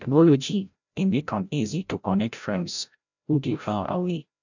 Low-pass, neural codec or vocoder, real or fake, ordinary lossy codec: 7.2 kHz; codec, 16 kHz, 0.5 kbps, FreqCodec, larger model; fake; none